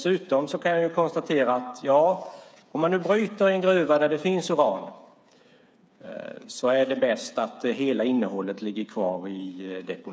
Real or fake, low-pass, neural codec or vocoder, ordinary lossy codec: fake; none; codec, 16 kHz, 8 kbps, FreqCodec, smaller model; none